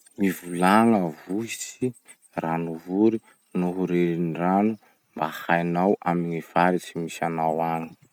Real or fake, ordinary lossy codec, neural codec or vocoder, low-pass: real; none; none; 19.8 kHz